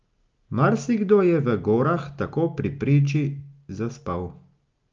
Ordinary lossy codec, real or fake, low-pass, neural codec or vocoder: Opus, 24 kbps; real; 7.2 kHz; none